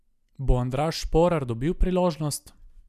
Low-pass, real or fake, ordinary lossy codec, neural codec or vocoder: 14.4 kHz; real; none; none